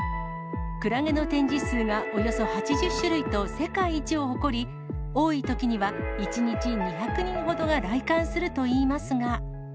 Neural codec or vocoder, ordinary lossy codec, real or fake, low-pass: none; none; real; none